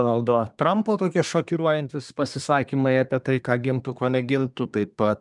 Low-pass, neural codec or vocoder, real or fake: 10.8 kHz; codec, 24 kHz, 1 kbps, SNAC; fake